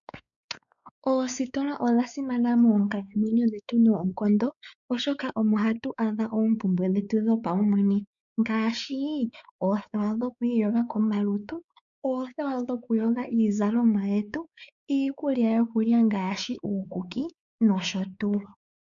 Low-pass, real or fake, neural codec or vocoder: 7.2 kHz; fake; codec, 16 kHz, 4 kbps, X-Codec, WavLM features, trained on Multilingual LibriSpeech